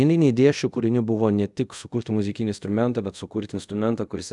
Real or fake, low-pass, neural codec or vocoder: fake; 10.8 kHz; codec, 24 kHz, 0.5 kbps, DualCodec